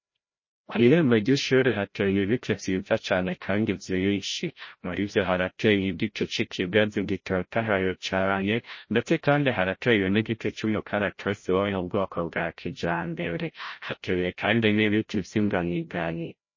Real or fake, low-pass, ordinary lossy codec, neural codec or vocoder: fake; 7.2 kHz; MP3, 32 kbps; codec, 16 kHz, 0.5 kbps, FreqCodec, larger model